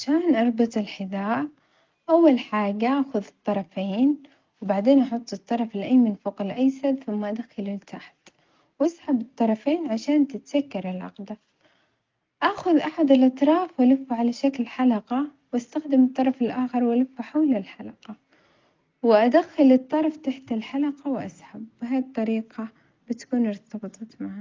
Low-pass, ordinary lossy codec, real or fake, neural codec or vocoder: 7.2 kHz; Opus, 32 kbps; real; none